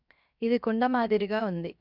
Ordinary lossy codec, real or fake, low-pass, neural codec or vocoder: none; fake; 5.4 kHz; codec, 16 kHz, 0.7 kbps, FocalCodec